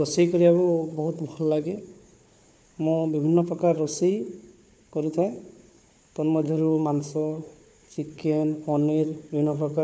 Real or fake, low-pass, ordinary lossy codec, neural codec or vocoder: fake; none; none; codec, 16 kHz, 4 kbps, FunCodec, trained on Chinese and English, 50 frames a second